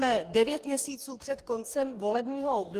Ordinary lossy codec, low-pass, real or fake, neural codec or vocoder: Opus, 24 kbps; 14.4 kHz; fake; codec, 44.1 kHz, 2.6 kbps, DAC